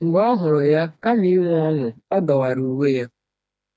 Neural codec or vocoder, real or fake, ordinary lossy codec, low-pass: codec, 16 kHz, 2 kbps, FreqCodec, smaller model; fake; none; none